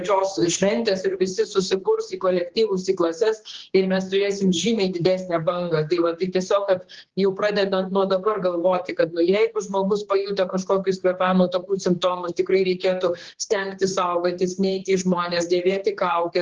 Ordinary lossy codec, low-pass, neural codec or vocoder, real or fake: Opus, 16 kbps; 7.2 kHz; codec, 16 kHz, 2 kbps, X-Codec, HuBERT features, trained on general audio; fake